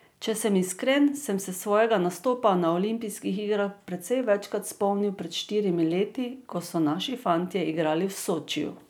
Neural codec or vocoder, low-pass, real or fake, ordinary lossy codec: none; none; real; none